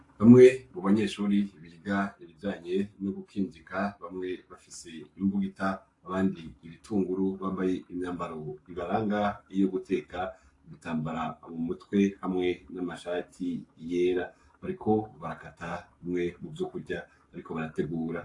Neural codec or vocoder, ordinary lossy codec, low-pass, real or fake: codec, 44.1 kHz, 7.8 kbps, Pupu-Codec; AAC, 48 kbps; 10.8 kHz; fake